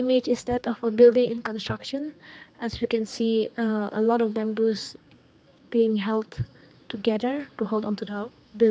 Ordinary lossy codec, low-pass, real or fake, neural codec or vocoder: none; none; fake; codec, 16 kHz, 2 kbps, X-Codec, HuBERT features, trained on general audio